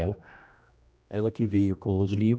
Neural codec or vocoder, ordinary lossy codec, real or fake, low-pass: codec, 16 kHz, 1 kbps, X-Codec, HuBERT features, trained on general audio; none; fake; none